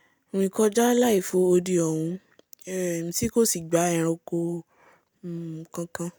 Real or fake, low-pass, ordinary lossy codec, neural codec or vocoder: real; none; none; none